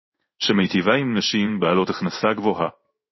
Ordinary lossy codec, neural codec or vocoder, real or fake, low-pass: MP3, 24 kbps; codec, 16 kHz in and 24 kHz out, 1 kbps, XY-Tokenizer; fake; 7.2 kHz